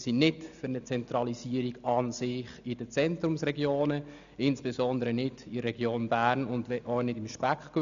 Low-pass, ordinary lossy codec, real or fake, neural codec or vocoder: 7.2 kHz; none; real; none